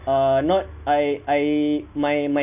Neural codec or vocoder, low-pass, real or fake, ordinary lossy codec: none; 3.6 kHz; real; none